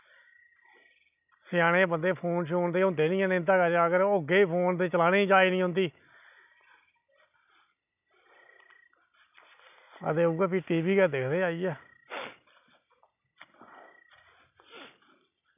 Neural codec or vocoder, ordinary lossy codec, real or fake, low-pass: none; none; real; 3.6 kHz